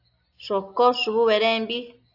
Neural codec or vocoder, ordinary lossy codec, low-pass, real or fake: none; AAC, 48 kbps; 5.4 kHz; real